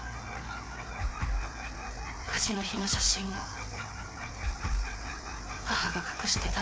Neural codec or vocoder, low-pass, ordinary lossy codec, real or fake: codec, 16 kHz, 4 kbps, FreqCodec, larger model; none; none; fake